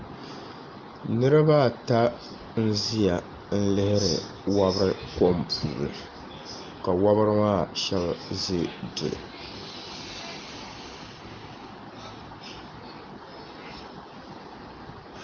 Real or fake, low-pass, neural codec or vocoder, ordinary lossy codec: real; 7.2 kHz; none; Opus, 32 kbps